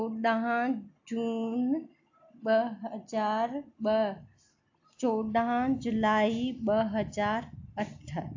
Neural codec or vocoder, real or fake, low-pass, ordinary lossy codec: none; real; 7.2 kHz; AAC, 48 kbps